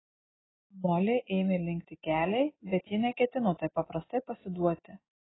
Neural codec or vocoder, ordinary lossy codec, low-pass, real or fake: none; AAC, 16 kbps; 7.2 kHz; real